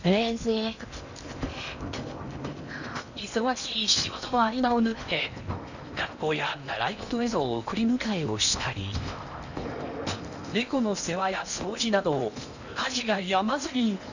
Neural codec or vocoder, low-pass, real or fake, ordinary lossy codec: codec, 16 kHz in and 24 kHz out, 0.8 kbps, FocalCodec, streaming, 65536 codes; 7.2 kHz; fake; none